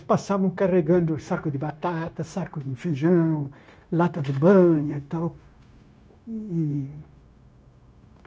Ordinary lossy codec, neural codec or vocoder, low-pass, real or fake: none; codec, 16 kHz, 0.9 kbps, LongCat-Audio-Codec; none; fake